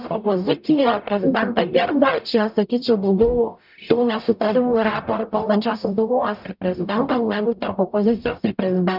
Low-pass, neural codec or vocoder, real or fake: 5.4 kHz; codec, 44.1 kHz, 0.9 kbps, DAC; fake